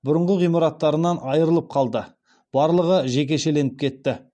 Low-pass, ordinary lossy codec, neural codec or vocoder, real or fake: 9.9 kHz; none; none; real